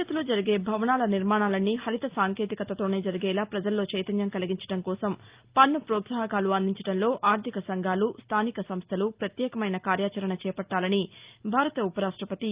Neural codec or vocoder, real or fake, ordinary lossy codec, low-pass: none; real; Opus, 32 kbps; 3.6 kHz